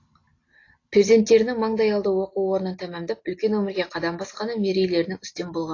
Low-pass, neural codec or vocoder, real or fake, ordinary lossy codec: 7.2 kHz; none; real; AAC, 32 kbps